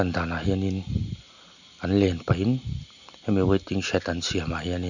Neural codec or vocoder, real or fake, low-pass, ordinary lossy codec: none; real; 7.2 kHz; none